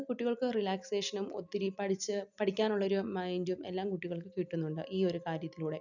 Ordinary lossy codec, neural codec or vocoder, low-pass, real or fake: none; none; 7.2 kHz; real